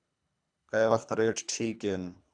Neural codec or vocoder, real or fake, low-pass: codec, 24 kHz, 3 kbps, HILCodec; fake; 9.9 kHz